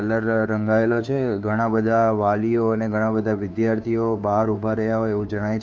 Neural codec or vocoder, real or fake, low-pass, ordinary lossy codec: codec, 16 kHz, 2 kbps, FunCodec, trained on Chinese and English, 25 frames a second; fake; none; none